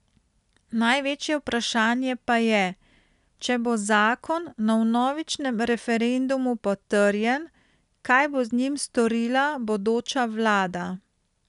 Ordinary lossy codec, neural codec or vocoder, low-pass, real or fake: none; none; 10.8 kHz; real